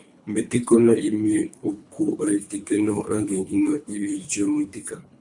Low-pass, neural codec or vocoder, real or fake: 10.8 kHz; codec, 24 kHz, 3 kbps, HILCodec; fake